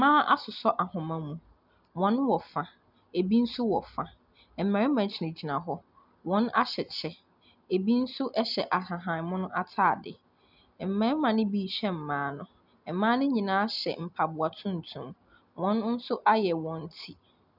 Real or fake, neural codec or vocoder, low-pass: real; none; 5.4 kHz